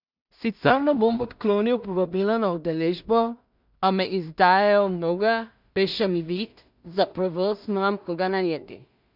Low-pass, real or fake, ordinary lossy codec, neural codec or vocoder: 5.4 kHz; fake; none; codec, 16 kHz in and 24 kHz out, 0.4 kbps, LongCat-Audio-Codec, two codebook decoder